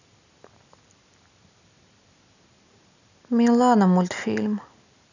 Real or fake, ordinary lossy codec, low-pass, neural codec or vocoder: real; none; 7.2 kHz; none